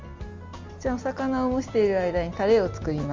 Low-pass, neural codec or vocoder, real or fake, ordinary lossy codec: 7.2 kHz; none; real; Opus, 32 kbps